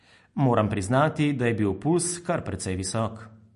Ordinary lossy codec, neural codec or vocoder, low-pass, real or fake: MP3, 48 kbps; none; 14.4 kHz; real